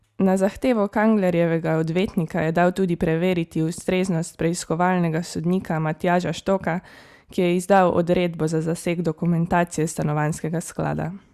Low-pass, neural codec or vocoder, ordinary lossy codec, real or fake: 14.4 kHz; none; Opus, 64 kbps; real